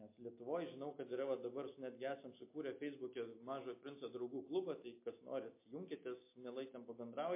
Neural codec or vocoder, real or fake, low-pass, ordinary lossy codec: none; real; 3.6 kHz; MP3, 24 kbps